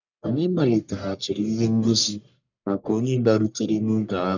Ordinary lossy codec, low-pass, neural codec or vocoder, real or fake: none; 7.2 kHz; codec, 44.1 kHz, 1.7 kbps, Pupu-Codec; fake